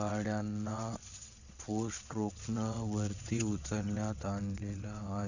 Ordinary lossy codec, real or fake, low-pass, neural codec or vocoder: none; fake; 7.2 kHz; vocoder, 22.05 kHz, 80 mel bands, WaveNeXt